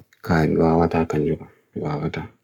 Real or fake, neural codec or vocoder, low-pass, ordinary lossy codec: fake; codec, 44.1 kHz, 7.8 kbps, Pupu-Codec; 19.8 kHz; none